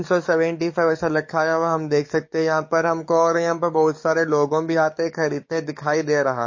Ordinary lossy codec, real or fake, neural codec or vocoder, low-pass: MP3, 32 kbps; fake; codec, 44.1 kHz, 7.8 kbps, DAC; 7.2 kHz